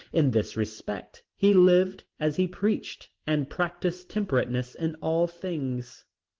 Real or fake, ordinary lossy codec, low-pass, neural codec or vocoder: real; Opus, 16 kbps; 7.2 kHz; none